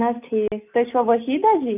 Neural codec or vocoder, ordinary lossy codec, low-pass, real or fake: none; none; 3.6 kHz; real